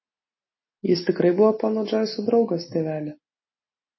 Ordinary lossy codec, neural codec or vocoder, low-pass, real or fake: MP3, 24 kbps; none; 7.2 kHz; real